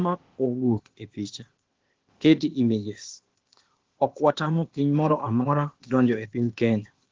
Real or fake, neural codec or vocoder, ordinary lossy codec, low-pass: fake; codec, 16 kHz, 0.8 kbps, ZipCodec; Opus, 16 kbps; 7.2 kHz